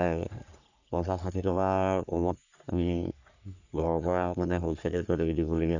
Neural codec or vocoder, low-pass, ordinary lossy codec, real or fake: codec, 44.1 kHz, 3.4 kbps, Pupu-Codec; 7.2 kHz; none; fake